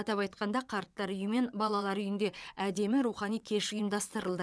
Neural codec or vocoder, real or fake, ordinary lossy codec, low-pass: vocoder, 22.05 kHz, 80 mel bands, WaveNeXt; fake; none; none